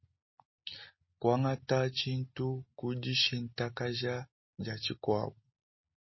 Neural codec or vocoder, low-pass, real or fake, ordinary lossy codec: none; 7.2 kHz; real; MP3, 24 kbps